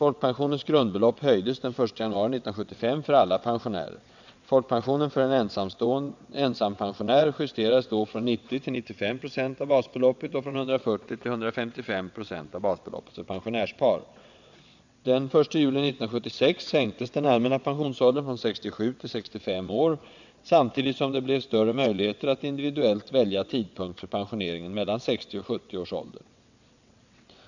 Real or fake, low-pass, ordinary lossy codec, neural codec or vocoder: fake; 7.2 kHz; none; vocoder, 22.05 kHz, 80 mel bands, WaveNeXt